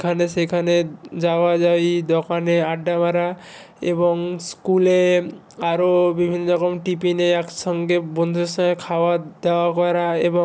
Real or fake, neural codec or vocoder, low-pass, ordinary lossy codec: real; none; none; none